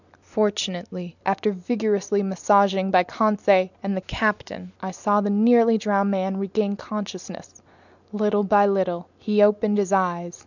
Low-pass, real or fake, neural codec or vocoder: 7.2 kHz; real; none